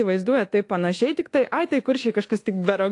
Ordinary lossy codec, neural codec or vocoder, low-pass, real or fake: AAC, 48 kbps; codec, 24 kHz, 1.2 kbps, DualCodec; 10.8 kHz; fake